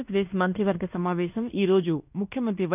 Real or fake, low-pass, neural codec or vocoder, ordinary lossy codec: fake; 3.6 kHz; codec, 16 kHz in and 24 kHz out, 0.9 kbps, LongCat-Audio-Codec, fine tuned four codebook decoder; none